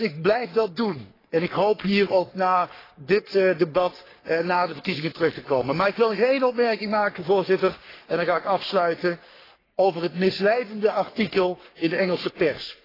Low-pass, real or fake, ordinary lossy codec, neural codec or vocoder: 5.4 kHz; fake; AAC, 24 kbps; codec, 44.1 kHz, 3.4 kbps, Pupu-Codec